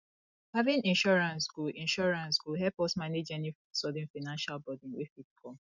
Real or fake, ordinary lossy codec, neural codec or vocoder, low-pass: real; none; none; 7.2 kHz